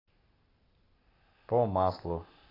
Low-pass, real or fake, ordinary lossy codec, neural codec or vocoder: 5.4 kHz; real; AAC, 24 kbps; none